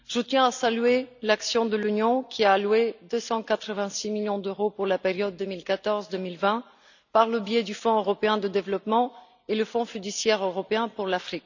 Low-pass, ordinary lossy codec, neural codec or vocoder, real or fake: 7.2 kHz; none; none; real